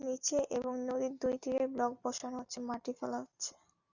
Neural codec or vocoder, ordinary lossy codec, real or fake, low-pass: none; Opus, 64 kbps; real; 7.2 kHz